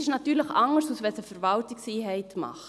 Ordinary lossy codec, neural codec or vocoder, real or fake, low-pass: none; none; real; none